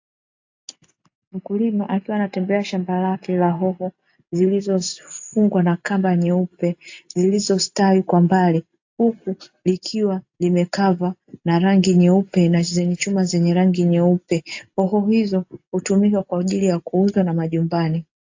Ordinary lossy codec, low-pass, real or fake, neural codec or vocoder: AAC, 48 kbps; 7.2 kHz; real; none